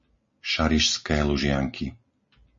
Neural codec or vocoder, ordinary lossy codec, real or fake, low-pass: none; MP3, 32 kbps; real; 7.2 kHz